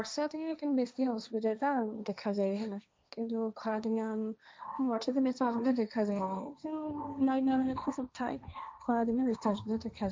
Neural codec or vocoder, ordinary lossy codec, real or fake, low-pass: codec, 16 kHz, 1.1 kbps, Voila-Tokenizer; none; fake; none